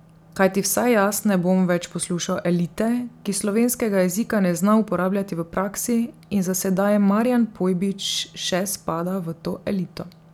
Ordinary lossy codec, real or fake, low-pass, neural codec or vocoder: none; real; 19.8 kHz; none